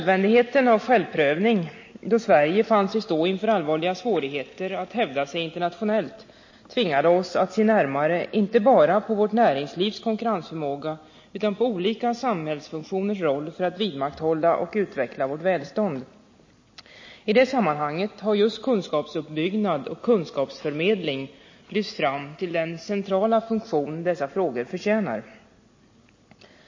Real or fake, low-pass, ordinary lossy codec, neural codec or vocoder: real; 7.2 kHz; MP3, 32 kbps; none